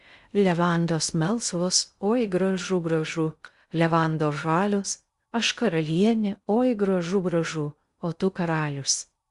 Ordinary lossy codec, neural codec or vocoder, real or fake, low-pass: Opus, 64 kbps; codec, 16 kHz in and 24 kHz out, 0.6 kbps, FocalCodec, streaming, 2048 codes; fake; 10.8 kHz